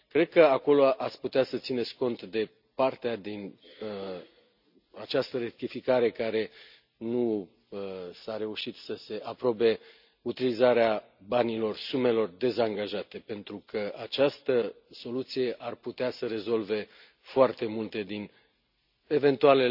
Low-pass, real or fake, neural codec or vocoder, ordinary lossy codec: 5.4 kHz; real; none; none